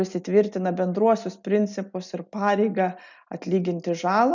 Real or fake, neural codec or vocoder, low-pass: real; none; 7.2 kHz